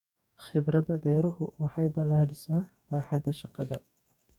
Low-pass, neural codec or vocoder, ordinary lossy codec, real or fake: 19.8 kHz; codec, 44.1 kHz, 2.6 kbps, DAC; none; fake